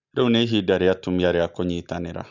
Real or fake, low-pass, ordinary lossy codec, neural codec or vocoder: real; 7.2 kHz; none; none